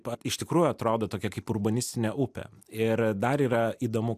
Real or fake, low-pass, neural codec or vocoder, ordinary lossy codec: real; 14.4 kHz; none; AAC, 96 kbps